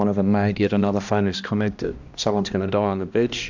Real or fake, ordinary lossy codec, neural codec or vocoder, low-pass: fake; MP3, 64 kbps; codec, 16 kHz, 1 kbps, X-Codec, HuBERT features, trained on balanced general audio; 7.2 kHz